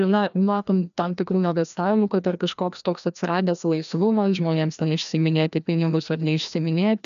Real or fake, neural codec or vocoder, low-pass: fake; codec, 16 kHz, 1 kbps, FreqCodec, larger model; 7.2 kHz